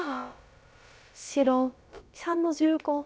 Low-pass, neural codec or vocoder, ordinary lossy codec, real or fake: none; codec, 16 kHz, about 1 kbps, DyCAST, with the encoder's durations; none; fake